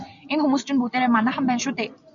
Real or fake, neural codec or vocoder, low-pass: real; none; 7.2 kHz